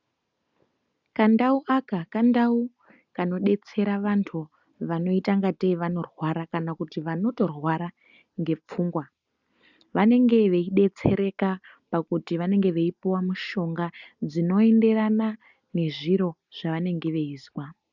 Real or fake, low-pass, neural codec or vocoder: real; 7.2 kHz; none